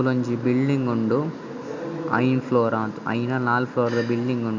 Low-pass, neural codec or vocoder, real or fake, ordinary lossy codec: 7.2 kHz; none; real; MP3, 64 kbps